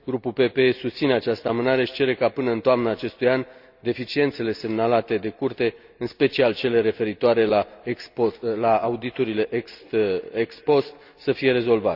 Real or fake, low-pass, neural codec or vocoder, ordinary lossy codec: real; 5.4 kHz; none; none